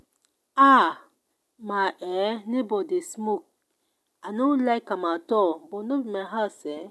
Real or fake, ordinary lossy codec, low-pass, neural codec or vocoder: real; none; none; none